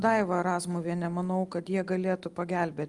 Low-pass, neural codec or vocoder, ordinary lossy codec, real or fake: 10.8 kHz; none; Opus, 32 kbps; real